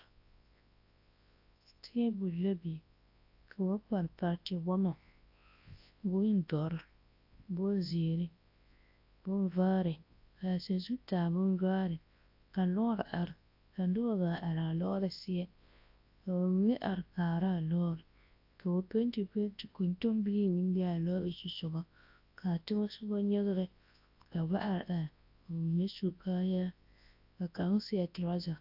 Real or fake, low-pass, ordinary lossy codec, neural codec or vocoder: fake; 5.4 kHz; AAC, 32 kbps; codec, 24 kHz, 0.9 kbps, WavTokenizer, large speech release